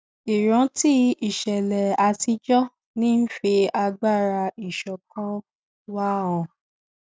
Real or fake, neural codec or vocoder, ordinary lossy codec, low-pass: real; none; none; none